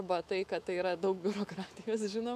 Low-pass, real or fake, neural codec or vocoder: 14.4 kHz; fake; autoencoder, 48 kHz, 128 numbers a frame, DAC-VAE, trained on Japanese speech